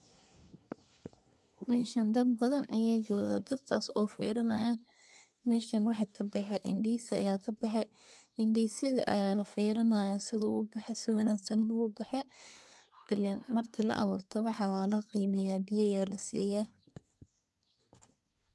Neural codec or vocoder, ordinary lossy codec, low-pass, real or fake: codec, 24 kHz, 1 kbps, SNAC; none; none; fake